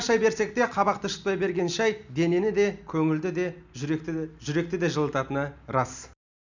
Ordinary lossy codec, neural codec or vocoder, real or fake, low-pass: none; none; real; 7.2 kHz